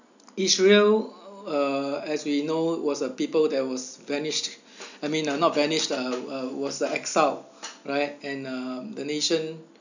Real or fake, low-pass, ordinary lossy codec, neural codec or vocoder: real; 7.2 kHz; none; none